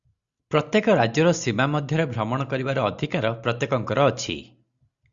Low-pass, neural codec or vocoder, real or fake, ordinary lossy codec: 7.2 kHz; none; real; Opus, 64 kbps